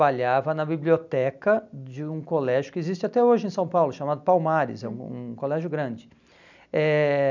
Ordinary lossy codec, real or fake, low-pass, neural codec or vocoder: none; real; 7.2 kHz; none